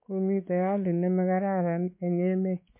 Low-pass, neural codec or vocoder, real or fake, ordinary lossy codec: 3.6 kHz; codec, 16 kHz, 4 kbps, X-Codec, WavLM features, trained on Multilingual LibriSpeech; fake; MP3, 24 kbps